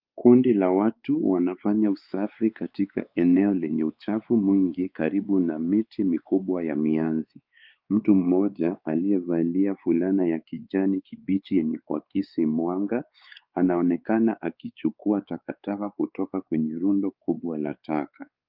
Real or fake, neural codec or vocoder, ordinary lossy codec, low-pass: fake; codec, 16 kHz, 4 kbps, X-Codec, WavLM features, trained on Multilingual LibriSpeech; Opus, 24 kbps; 5.4 kHz